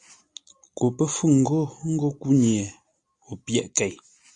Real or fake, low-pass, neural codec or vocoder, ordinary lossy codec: real; 9.9 kHz; none; Opus, 64 kbps